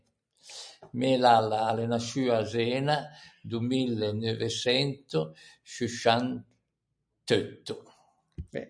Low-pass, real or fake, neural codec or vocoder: 9.9 kHz; real; none